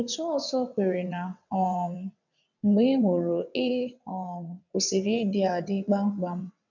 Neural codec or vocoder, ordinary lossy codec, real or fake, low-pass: vocoder, 22.05 kHz, 80 mel bands, WaveNeXt; none; fake; 7.2 kHz